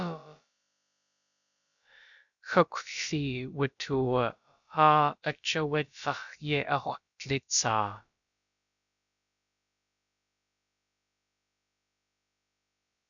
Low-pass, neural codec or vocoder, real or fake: 7.2 kHz; codec, 16 kHz, about 1 kbps, DyCAST, with the encoder's durations; fake